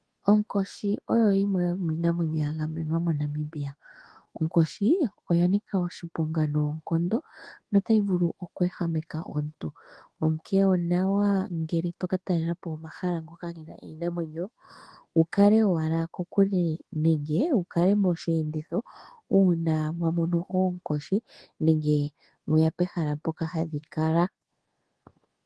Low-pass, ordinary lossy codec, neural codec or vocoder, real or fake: 10.8 kHz; Opus, 16 kbps; codec, 24 kHz, 1.2 kbps, DualCodec; fake